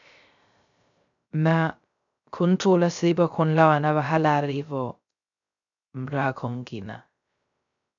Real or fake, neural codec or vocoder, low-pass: fake; codec, 16 kHz, 0.3 kbps, FocalCodec; 7.2 kHz